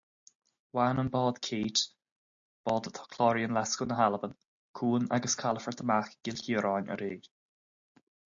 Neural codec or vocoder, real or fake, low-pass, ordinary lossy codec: none; real; 7.2 kHz; AAC, 64 kbps